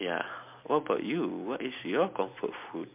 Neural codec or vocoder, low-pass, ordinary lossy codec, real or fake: none; 3.6 kHz; MP3, 32 kbps; real